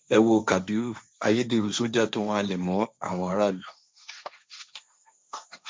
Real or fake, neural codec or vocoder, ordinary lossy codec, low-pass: fake; codec, 16 kHz, 1.1 kbps, Voila-Tokenizer; none; none